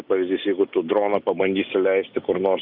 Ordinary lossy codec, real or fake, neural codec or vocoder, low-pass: Opus, 64 kbps; real; none; 5.4 kHz